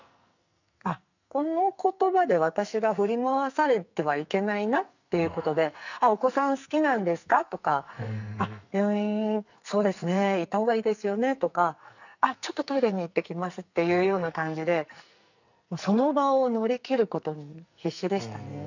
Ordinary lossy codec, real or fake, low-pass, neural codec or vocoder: none; fake; 7.2 kHz; codec, 44.1 kHz, 2.6 kbps, SNAC